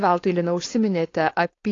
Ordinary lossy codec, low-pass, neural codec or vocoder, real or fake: AAC, 32 kbps; 7.2 kHz; codec, 16 kHz, 4.8 kbps, FACodec; fake